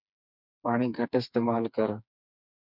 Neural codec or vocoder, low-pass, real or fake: codec, 16 kHz, 4 kbps, FreqCodec, smaller model; 5.4 kHz; fake